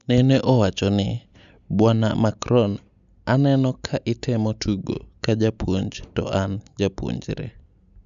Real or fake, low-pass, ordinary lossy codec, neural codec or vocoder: real; 7.2 kHz; none; none